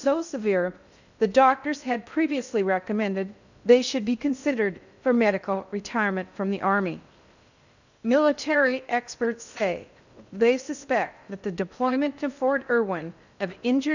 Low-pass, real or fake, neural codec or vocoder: 7.2 kHz; fake; codec, 16 kHz in and 24 kHz out, 0.6 kbps, FocalCodec, streaming, 2048 codes